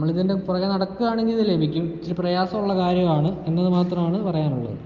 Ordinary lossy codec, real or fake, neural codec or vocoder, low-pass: Opus, 32 kbps; real; none; 7.2 kHz